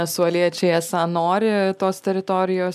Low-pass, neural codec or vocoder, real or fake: 14.4 kHz; none; real